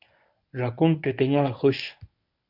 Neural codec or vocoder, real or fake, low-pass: codec, 24 kHz, 0.9 kbps, WavTokenizer, medium speech release version 1; fake; 5.4 kHz